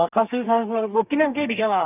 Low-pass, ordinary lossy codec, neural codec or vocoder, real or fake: 3.6 kHz; none; codec, 32 kHz, 1.9 kbps, SNAC; fake